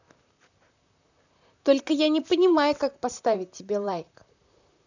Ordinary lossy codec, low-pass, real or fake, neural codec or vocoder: none; 7.2 kHz; fake; vocoder, 44.1 kHz, 128 mel bands, Pupu-Vocoder